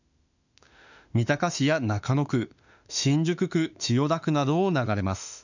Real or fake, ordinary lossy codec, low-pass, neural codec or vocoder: fake; none; 7.2 kHz; autoencoder, 48 kHz, 32 numbers a frame, DAC-VAE, trained on Japanese speech